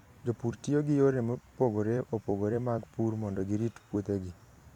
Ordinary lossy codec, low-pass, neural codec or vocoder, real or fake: none; 19.8 kHz; vocoder, 44.1 kHz, 128 mel bands every 256 samples, BigVGAN v2; fake